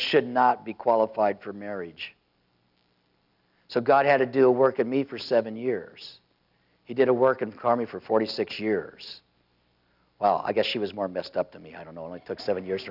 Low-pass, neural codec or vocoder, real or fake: 5.4 kHz; none; real